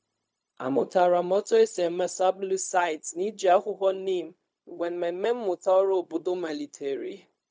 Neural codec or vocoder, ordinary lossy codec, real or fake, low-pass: codec, 16 kHz, 0.4 kbps, LongCat-Audio-Codec; none; fake; none